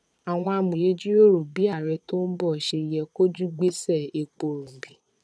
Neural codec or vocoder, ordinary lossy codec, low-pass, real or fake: vocoder, 22.05 kHz, 80 mel bands, Vocos; none; none; fake